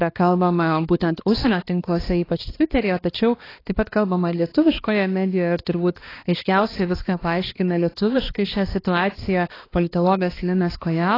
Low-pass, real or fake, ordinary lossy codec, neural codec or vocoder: 5.4 kHz; fake; AAC, 24 kbps; codec, 16 kHz, 2 kbps, X-Codec, HuBERT features, trained on balanced general audio